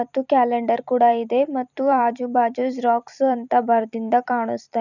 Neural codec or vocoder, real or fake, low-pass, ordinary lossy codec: vocoder, 44.1 kHz, 128 mel bands every 256 samples, BigVGAN v2; fake; 7.2 kHz; none